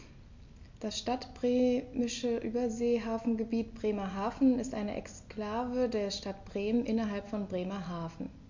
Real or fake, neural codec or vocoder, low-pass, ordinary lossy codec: real; none; 7.2 kHz; MP3, 64 kbps